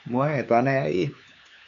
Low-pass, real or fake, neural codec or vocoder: 7.2 kHz; fake; codec, 16 kHz, 4 kbps, X-Codec, HuBERT features, trained on LibriSpeech